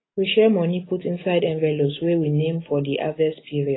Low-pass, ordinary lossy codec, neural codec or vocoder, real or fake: 7.2 kHz; AAC, 16 kbps; none; real